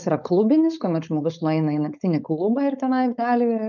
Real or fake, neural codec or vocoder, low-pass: fake; codec, 16 kHz, 4.8 kbps, FACodec; 7.2 kHz